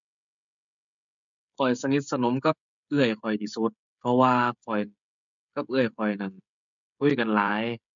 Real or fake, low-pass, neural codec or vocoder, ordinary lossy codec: fake; 7.2 kHz; codec, 16 kHz, 16 kbps, FreqCodec, smaller model; MP3, 64 kbps